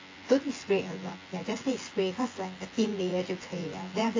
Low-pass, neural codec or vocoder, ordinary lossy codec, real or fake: 7.2 kHz; vocoder, 24 kHz, 100 mel bands, Vocos; AAC, 32 kbps; fake